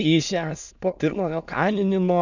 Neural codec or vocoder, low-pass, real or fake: autoencoder, 22.05 kHz, a latent of 192 numbers a frame, VITS, trained on many speakers; 7.2 kHz; fake